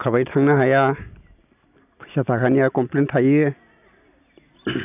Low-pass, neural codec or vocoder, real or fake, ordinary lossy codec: 3.6 kHz; none; real; AAC, 32 kbps